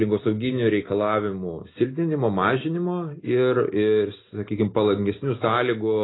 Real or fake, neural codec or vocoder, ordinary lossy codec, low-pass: real; none; AAC, 16 kbps; 7.2 kHz